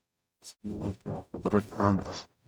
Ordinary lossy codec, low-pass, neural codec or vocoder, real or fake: none; none; codec, 44.1 kHz, 0.9 kbps, DAC; fake